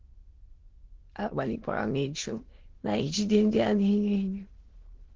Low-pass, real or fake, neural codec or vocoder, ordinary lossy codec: 7.2 kHz; fake; autoencoder, 22.05 kHz, a latent of 192 numbers a frame, VITS, trained on many speakers; Opus, 16 kbps